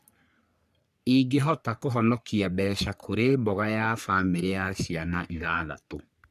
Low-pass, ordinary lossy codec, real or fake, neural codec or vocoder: 14.4 kHz; none; fake; codec, 44.1 kHz, 3.4 kbps, Pupu-Codec